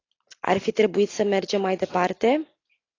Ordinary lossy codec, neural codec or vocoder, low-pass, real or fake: AAC, 32 kbps; none; 7.2 kHz; real